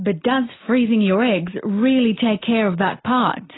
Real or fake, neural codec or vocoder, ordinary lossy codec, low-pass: real; none; AAC, 16 kbps; 7.2 kHz